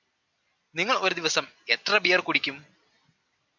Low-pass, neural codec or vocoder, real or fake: 7.2 kHz; none; real